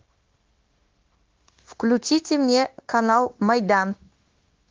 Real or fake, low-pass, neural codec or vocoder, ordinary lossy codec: fake; 7.2 kHz; codec, 16 kHz, 0.9 kbps, LongCat-Audio-Codec; Opus, 16 kbps